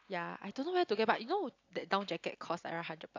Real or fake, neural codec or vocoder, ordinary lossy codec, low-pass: real; none; AAC, 48 kbps; 7.2 kHz